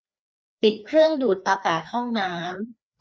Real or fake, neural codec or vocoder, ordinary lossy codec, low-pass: fake; codec, 16 kHz, 2 kbps, FreqCodec, larger model; none; none